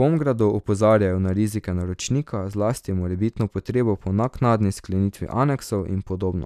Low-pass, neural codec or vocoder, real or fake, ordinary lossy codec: 14.4 kHz; none; real; none